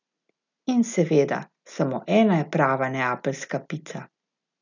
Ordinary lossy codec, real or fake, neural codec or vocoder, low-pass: none; real; none; 7.2 kHz